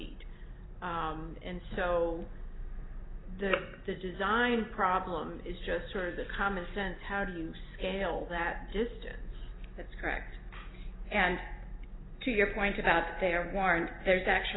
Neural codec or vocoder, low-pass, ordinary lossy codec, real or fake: none; 7.2 kHz; AAC, 16 kbps; real